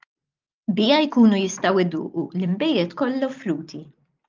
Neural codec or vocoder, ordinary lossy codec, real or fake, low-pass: none; Opus, 32 kbps; real; 7.2 kHz